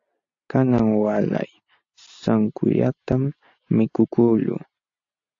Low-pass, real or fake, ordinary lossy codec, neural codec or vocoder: 7.2 kHz; real; AAC, 48 kbps; none